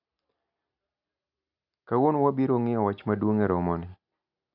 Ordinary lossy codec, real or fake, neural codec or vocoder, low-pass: none; real; none; 5.4 kHz